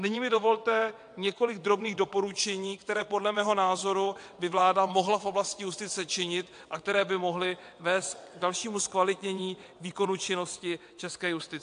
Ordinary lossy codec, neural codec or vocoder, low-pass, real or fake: AAC, 64 kbps; vocoder, 22.05 kHz, 80 mel bands, WaveNeXt; 9.9 kHz; fake